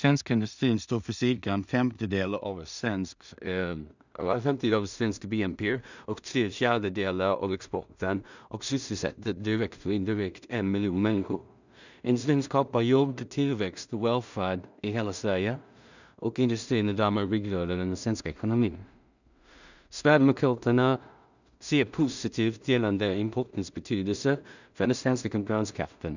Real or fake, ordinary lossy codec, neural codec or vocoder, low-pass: fake; none; codec, 16 kHz in and 24 kHz out, 0.4 kbps, LongCat-Audio-Codec, two codebook decoder; 7.2 kHz